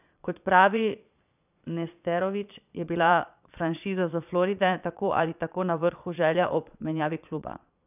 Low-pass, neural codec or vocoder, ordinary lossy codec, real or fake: 3.6 kHz; vocoder, 22.05 kHz, 80 mel bands, Vocos; none; fake